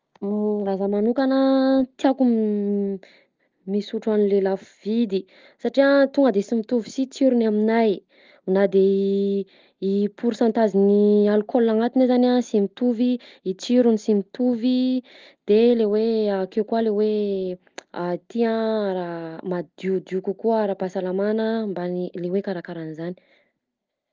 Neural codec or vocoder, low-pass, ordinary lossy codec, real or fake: none; 7.2 kHz; Opus, 32 kbps; real